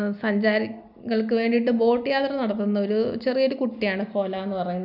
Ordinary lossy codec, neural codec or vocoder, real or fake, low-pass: none; none; real; 5.4 kHz